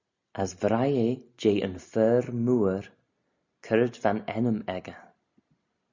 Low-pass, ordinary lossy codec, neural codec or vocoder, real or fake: 7.2 kHz; Opus, 64 kbps; none; real